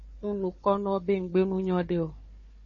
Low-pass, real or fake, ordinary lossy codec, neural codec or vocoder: 7.2 kHz; fake; MP3, 32 kbps; codec, 16 kHz, 4 kbps, FunCodec, trained on Chinese and English, 50 frames a second